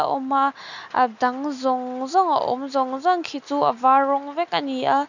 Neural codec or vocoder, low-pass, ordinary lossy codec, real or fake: none; 7.2 kHz; none; real